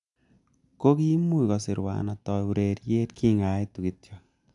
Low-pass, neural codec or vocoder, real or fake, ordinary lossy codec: 10.8 kHz; none; real; none